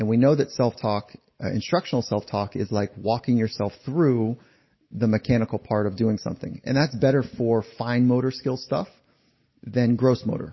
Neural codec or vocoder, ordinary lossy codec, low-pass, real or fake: none; MP3, 24 kbps; 7.2 kHz; real